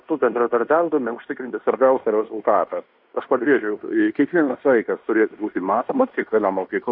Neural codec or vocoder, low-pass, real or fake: codec, 16 kHz in and 24 kHz out, 0.9 kbps, LongCat-Audio-Codec, fine tuned four codebook decoder; 5.4 kHz; fake